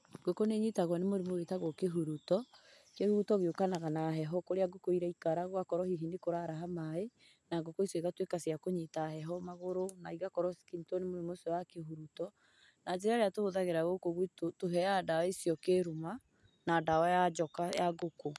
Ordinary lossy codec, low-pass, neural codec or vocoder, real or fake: none; none; none; real